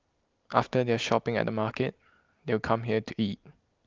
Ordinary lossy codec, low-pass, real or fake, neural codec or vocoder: Opus, 32 kbps; 7.2 kHz; real; none